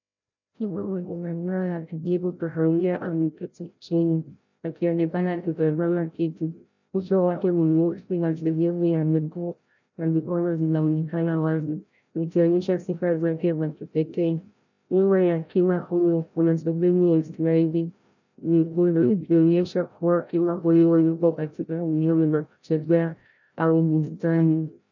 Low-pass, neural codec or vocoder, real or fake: 7.2 kHz; codec, 16 kHz, 0.5 kbps, FreqCodec, larger model; fake